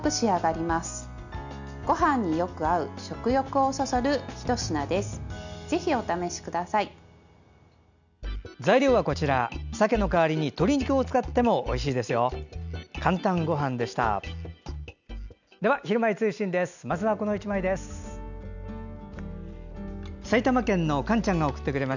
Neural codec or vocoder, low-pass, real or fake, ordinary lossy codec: none; 7.2 kHz; real; none